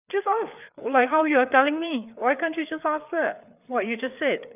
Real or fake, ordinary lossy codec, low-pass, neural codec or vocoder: fake; none; 3.6 kHz; codec, 16 kHz, 4 kbps, FreqCodec, larger model